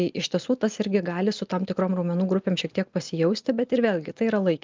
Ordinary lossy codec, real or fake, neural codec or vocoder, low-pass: Opus, 24 kbps; real; none; 7.2 kHz